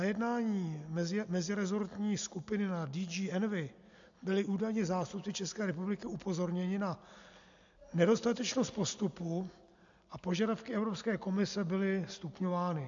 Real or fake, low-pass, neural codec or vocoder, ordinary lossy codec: real; 7.2 kHz; none; AAC, 64 kbps